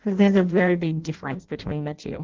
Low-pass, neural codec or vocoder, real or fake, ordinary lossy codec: 7.2 kHz; codec, 16 kHz in and 24 kHz out, 0.6 kbps, FireRedTTS-2 codec; fake; Opus, 16 kbps